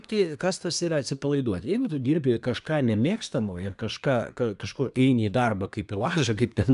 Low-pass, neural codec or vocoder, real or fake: 10.8 kHz; codec, 24 kHz, 1 kbps, SNAC; fake